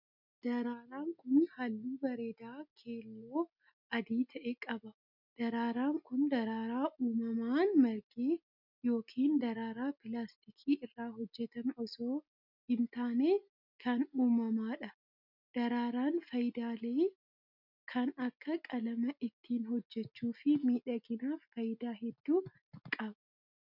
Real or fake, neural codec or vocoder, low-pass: real; none; 5.4 kHz